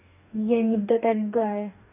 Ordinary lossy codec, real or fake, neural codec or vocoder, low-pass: none; fake; codec, 44.1 kHz, 2.6 kbps, DAC; 3.6 kHz